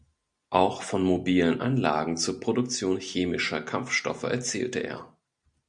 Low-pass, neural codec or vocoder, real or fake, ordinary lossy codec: 9.9 kHz; none; real; Opus, 64 kbps